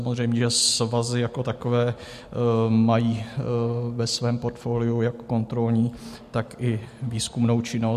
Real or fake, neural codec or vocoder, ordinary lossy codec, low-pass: real; none; MP3, 64 kbps; 14.4 kHz